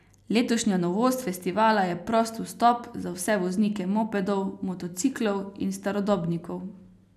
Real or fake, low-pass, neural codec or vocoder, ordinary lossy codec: real; 14.4 kHz; none; none